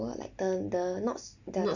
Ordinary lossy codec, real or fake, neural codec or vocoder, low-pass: none; real; none; 7.2 kHz